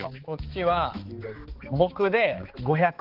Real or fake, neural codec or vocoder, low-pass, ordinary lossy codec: fake; codec, 16 kHz, 4 kbps, X-Codec, HuBERT features, trained on balanced general audio; 5.4 kHz; Opus, 16 kbps